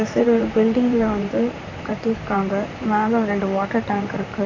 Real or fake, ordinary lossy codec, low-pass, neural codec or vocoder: fake; AAC, 32 kbps; 7.2 kHz; vocoder, 44.1 kHz, 128 mel bands, Pupu-Vocoder